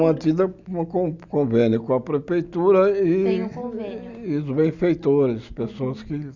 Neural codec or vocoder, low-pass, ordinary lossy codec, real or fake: none; 7.2 kHz; Opus, 64 kbps; real